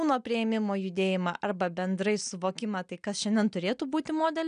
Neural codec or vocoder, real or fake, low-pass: none; real; 9.9 kHz